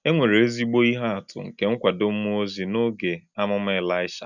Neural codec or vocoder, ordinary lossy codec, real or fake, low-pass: none; none; real; 7.2 kHz